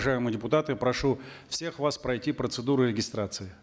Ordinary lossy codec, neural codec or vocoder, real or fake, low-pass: none; none; real; none